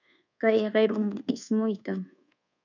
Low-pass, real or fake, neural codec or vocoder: 7.2 kHz; fake; codec, 24 kHz, 1.2 kbps, DualCodec